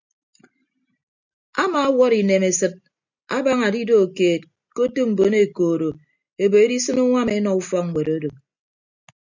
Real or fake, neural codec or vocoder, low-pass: real; none; 7.2 kHz